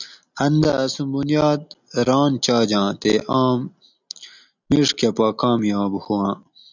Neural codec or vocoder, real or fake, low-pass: none; real; 7.2 kHz